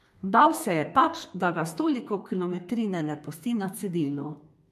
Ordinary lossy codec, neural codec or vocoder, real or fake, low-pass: MP3, 64 kbps; codec, 32 kHz, 1.9 kbps, SNAC; fake; 14.4 kHz